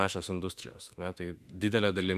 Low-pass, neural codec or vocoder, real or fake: 14.4 kHz; autoencoder, 48 kHz, 32 numbers a frame, DAC-VAE, trained on Japanese speech; fake